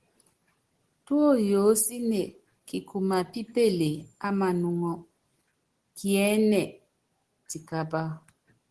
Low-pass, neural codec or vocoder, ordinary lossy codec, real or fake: 10.8 kHz; none; Opus, 16 kbps; real